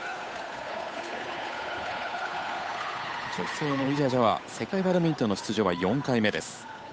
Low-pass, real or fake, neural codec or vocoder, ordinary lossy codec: none; fake; codec, 16 kHz, 8 kbps, FunCodec, trained on Chinese and English, 25 frames a second; none